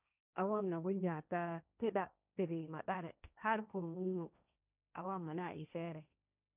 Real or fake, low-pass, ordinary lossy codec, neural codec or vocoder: fake; 3.6 kHz; none; codec, 16 kHz, 1.1 kbps, Voila-Tokenizer